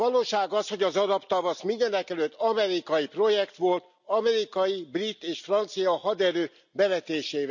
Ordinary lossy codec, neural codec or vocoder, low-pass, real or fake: none; none; 7.2 kHz; real